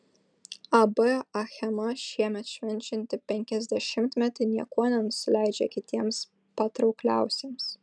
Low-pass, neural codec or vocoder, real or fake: 9.9 kHz; none; real